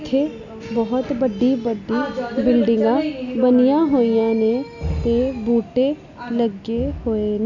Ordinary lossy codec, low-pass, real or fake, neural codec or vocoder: none; 7.2 kHz; real; none